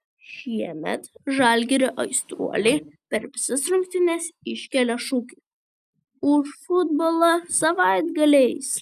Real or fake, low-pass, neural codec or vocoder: real; 14.4 kHz; none